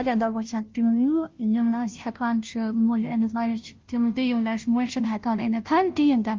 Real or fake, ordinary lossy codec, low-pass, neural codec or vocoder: fake; Opus, 24 kbps; 7.2 kHz; codec, 16 kHz, 0.5 kbps, FunCodec, trained on Chinese and English, 25 frames a second